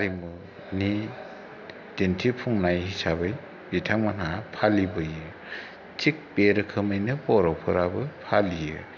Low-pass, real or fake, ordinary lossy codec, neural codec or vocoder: 7.2 kHz; real; none; none